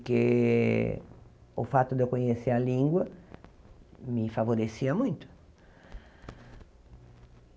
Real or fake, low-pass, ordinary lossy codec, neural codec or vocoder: real; none; none; none